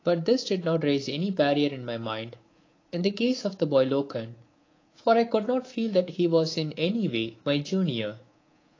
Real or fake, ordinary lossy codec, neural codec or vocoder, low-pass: fake; AAC, 32 kbps; codec, 24 kHz, 3.1 kbps, DualCodec; 7.2 kHz